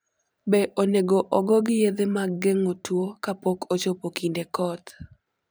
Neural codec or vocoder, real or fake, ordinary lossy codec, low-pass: vocoder, 44.1 kHz, 128 mel bands every 512 samples, BigVGAN v2; fake; none; none